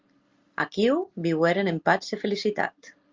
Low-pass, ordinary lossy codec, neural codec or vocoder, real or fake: 7.2 kHz; Opus, 32 kbps; none; real